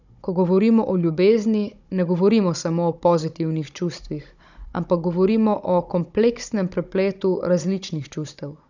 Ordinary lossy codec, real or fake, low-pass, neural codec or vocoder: none; fake; 7.2 kHz; codec, 16 kHz, 16 kbps, FunCodec, trained on Chinese and English, 50 frames a second